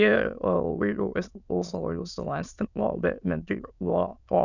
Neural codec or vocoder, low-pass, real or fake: autoencoder, 22.05 kHz, a latent of 192 numbers a frame, VITS, trained on many speakers; 7.2 kHz; fake